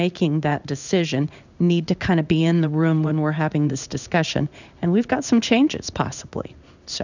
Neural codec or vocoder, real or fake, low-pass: codec, 16 kHz in and 24 kHz out, 1 kbps, XY-Tokenizer; fake; 7.2 kHz